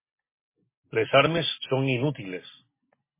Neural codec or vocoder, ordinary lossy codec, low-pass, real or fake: none; MP3, 16 kbps; 3.6 kHz; real